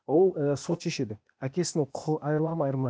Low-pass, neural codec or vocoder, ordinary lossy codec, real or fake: none; codec, 16 kHz, 0.8 kbps, ZipCodec; none; fake